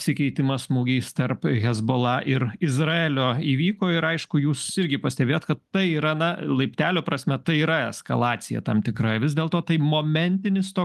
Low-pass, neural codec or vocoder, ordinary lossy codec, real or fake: 14.4 kHz; none; Opus, 32 kbps; real